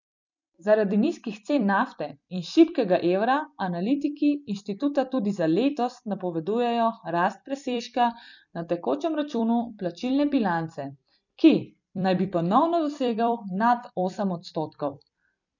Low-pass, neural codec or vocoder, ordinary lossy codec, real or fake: 7.2 kHz; vocoder, 44.1 kHz, 128 mel bands every 256 samples, BigVGAN v2; none; fake